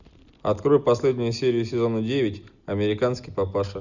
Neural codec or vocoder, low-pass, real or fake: none; 7.2 kHz; real